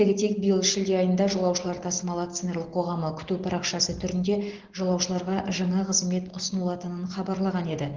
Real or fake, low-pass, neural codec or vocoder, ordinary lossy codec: real; 7.2 kHz; none; Opus, 16 kbps